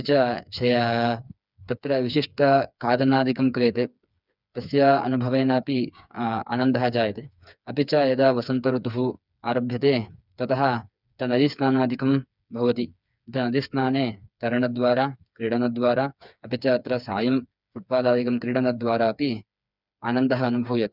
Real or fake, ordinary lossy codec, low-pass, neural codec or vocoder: fake; none; 5.4 kHz; codec, 16 kHz, 4 kbps, FreqCodec, smaller model